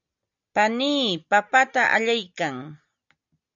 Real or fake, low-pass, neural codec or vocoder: real; 7.2 kHz; none